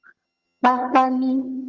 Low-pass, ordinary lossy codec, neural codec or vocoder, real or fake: 7.2 kHz; Opus, 32 kbps; vocoder, 22.05 kHz, 80 mel bands, HiFi-GAN; fake